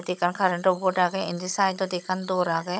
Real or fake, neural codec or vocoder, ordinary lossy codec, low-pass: fake; codec, 16 kHz, 16 kbps, FunCodec, trained on Chinese and English, 50 frames a second; none; none